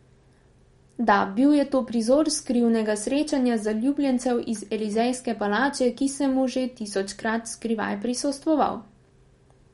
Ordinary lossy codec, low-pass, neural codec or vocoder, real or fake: MP3, 48 kbps; 19.8 kHz; none; real